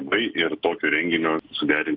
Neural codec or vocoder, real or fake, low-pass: none; real; 5.4 kHz